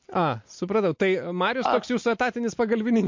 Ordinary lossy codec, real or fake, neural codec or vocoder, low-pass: MP3, 48 kbps; real; none; 7.2 kHz